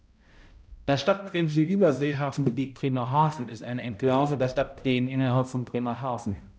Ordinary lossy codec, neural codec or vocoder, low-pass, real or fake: none; codec, 16 kHz, 0.5 kbps, X-Codec, HuBERT features, trained on balanced general audio; none; fake